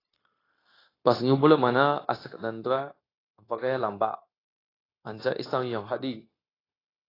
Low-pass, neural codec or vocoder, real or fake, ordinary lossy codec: 5.4 kHz; codec, 16 kHz, 0.9 kbps, LongCat-Audio-Codec; fake; AAC, 24 kbps